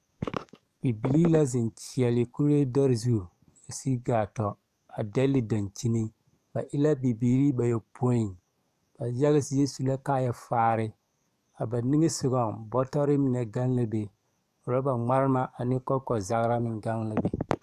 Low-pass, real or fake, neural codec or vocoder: 14.4 kHz; fake; codec, 44.1 kHz, 7.8 kbps, DAC